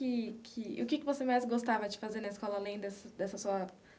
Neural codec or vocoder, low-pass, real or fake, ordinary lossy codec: none; none; real; none